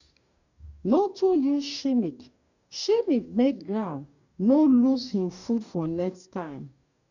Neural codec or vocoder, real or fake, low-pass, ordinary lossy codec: codec, 44.1 kHz, 2.6 kbps, DAC; fake; 7.2 kHz; none